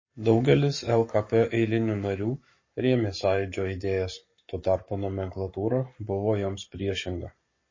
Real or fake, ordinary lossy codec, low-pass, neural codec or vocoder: fake; MP3, 32 kbps; 7.2 kHz; codec, 44.1 kHz, 7.8 kbps, Pupu-Codec